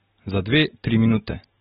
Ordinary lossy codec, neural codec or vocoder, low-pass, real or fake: AAC, 16 kbps; none; 19.8 kHz; real